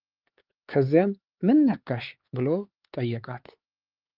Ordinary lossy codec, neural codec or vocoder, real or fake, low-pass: Opus, 32 kbps; codec, 16 kHz, 4 kbps, X-Codec, HuBERT features, trained on LibriSpeech; fake; 5.4 kHz